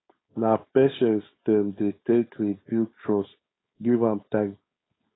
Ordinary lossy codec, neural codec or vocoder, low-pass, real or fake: AAC, 16 kbps; codec, 16 kHz, 4.8 kbps, FACodec; 7.2 kHz; fake